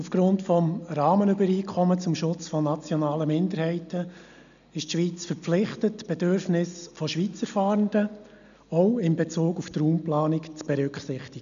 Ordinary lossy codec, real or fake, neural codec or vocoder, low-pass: none; real; none; 7.2 kHz